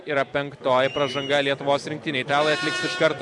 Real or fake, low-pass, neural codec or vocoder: fake; 10.8 kHz; vocoder, 44.1 kHz, 128 mel bands every 256 samples, BigVGAN v2